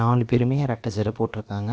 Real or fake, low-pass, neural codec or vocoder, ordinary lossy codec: fake; none; codec, 16 kHz, about 1 kbps, DyCAST, with the encoder's durations; none